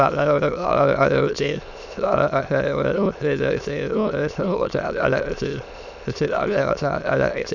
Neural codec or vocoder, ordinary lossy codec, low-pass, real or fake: autoencoder, 22.05 kHz, a latent of 192 numbers a frame, VITS, trained on many speakers; none; 7.2 kHz; fake